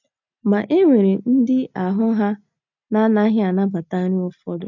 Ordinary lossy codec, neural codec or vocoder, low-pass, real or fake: none; none; 7.2 kHz; real